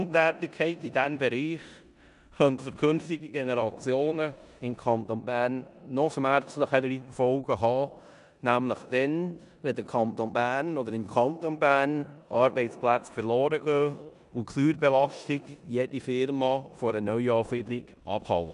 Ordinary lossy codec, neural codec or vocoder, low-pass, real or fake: none; codec, 16 kHz in and 24 kHz out, 0.9 kbps, LongCat-Audio-Codec, four codebook decoder; 10.8 kHz; fake